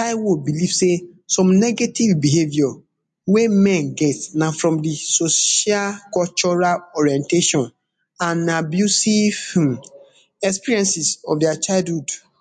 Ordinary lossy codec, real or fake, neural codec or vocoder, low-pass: MP3, 48 kbps; real; none; 10.8 kHz